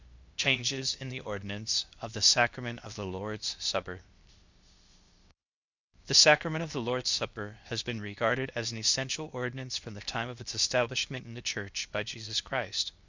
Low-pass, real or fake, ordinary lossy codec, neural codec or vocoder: 7.2 kHz; fake; Opus, 64 kbps; codec, 16 kHz, 0.8 kbps, ZipCodec